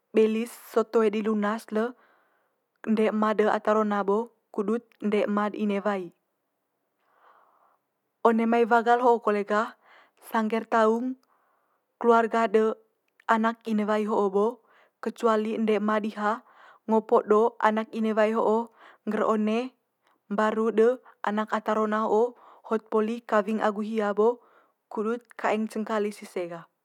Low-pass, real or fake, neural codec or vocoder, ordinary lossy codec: 19.8 kHz; real; none; none